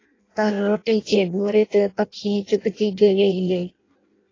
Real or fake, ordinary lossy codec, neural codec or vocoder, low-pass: fake; AAC, 32 kbps; codec, 16 kHz in and 24 kHz out, 0.6 kbps, FireRedTTS-2 codec; 7.2 kHz